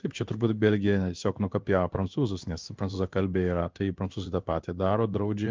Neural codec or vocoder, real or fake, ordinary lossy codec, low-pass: codec, 16 kHz in and 24 kHz out, 1 kbps, XY-Tokenizer; fake; Opus, 24 kbps; 7.2 kHz